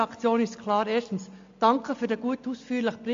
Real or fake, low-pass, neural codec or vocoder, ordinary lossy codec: real; 7.2 kHz; none; MP3, 48 kbps